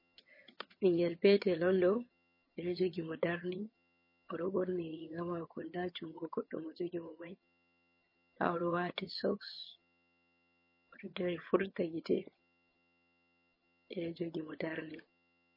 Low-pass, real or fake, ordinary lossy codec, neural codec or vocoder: 5.4 kHz; fake; MP3, 24 kbps; vocoder, 22.05 kHz, 80 mel bands, HiFi-GAN